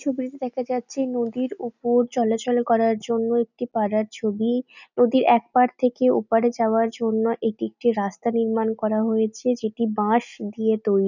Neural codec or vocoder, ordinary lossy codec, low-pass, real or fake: none; none; 7.2 kHz; real